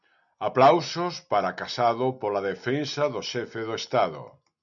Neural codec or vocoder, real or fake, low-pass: none; real; 7.2 kHz